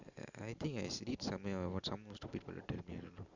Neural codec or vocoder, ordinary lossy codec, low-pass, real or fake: none; Opus, 64 kbps; 7.2 kHz; real